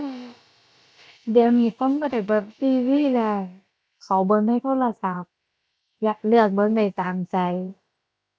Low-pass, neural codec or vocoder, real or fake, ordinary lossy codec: none; codec, 16 kHz, about 1 kbps, DyCAST, with the encoder's durations; fake; none